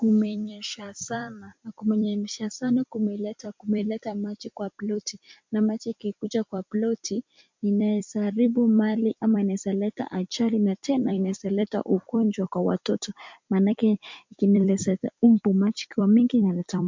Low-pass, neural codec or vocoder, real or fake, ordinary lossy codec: 7.2 kHz; vocoder, 44.1 kHz, 128 mel bands every 256 samples, BigVGAN v2; fake; MP3, 64 kbps